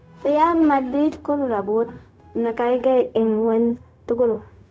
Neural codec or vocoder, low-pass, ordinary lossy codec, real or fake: codec, 16 kHz, 0.4 kbps, LongCat-Audio-Codec; none; none; fake